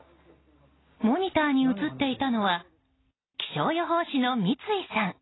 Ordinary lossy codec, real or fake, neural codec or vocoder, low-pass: AAC, 16 kbps; real; none; 7.2 kHz